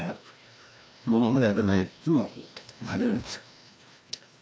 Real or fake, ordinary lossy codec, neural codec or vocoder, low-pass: fake; none; codec, 16 kHz, 1 kbps, FreqCodec, larger model; none